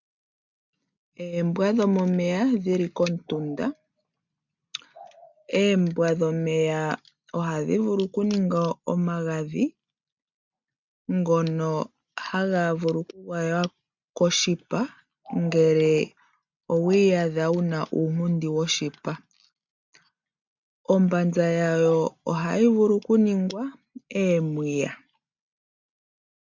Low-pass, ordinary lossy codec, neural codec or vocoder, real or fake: 7.2 kHz; MP3, 64 kbps; none; real